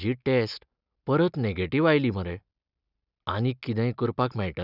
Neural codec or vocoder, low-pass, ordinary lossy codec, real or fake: none; 5.4 kHz; none; real